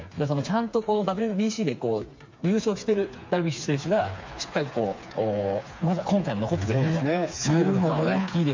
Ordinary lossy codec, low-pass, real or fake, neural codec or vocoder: MP3, 48 kbps; 7.2 kHz; fake; codec, 16 kHz, 4 kbps, FreqCodec, smaller model